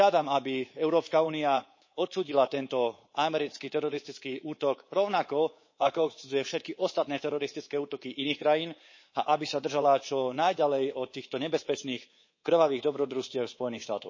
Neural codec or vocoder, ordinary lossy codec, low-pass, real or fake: codec, 24 kHz, 3.1 kbps, DualCodec; MP3, 32 kbps; 7.2 kHz; fake